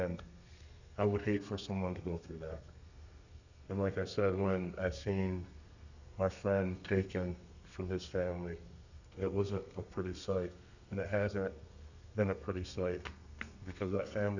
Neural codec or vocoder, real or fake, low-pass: codec, 32 kHz, 1.9 kbps, SNAC; fake; 7.2 kHz